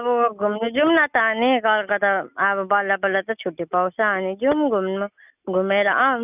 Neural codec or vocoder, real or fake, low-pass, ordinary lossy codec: none; real; 3.6 kHz; none